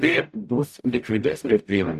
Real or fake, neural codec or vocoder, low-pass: fake; codec, 44.1 kHz, 0.9 kbps, DAC; 14.4 kHz